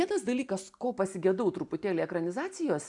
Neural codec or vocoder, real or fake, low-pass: none; real; 10.8 kHz